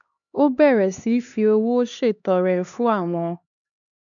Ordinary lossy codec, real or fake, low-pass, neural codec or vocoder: none; fake; 7.2 kHz; codec, 16 kHz, 2 kbps, X-Codec, HuBERT features, trained on LibriSpeech